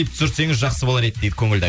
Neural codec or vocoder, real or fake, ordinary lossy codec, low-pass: none; real; none; none